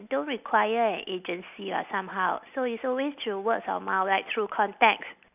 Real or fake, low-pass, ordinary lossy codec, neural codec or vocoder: real; 3.6 kHz; none; none